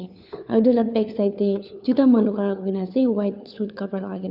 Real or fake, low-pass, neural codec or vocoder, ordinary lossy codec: fake; 5.4 kHz; codec, 16 kHz, 4 kbps, FunCodec, trained on LibriTTS, 50 frames a second; none